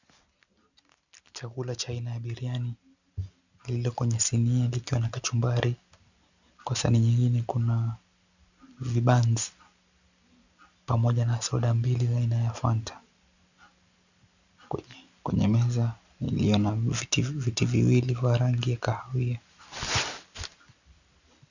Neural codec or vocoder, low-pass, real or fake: none; 7.2 kHz; real